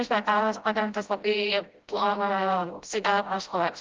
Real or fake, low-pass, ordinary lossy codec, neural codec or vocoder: fake; 7.2 kHz; Opus, 24 kbps; codec, 16 kHz, 0.5 kbps, FreqCodec, smaller model